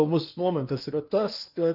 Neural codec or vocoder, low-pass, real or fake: codec, 16 kHz in and 24 kHz out, 0.8 kbps, FocalCodec, streaming, 65536 codes; 5.4 kHz; fake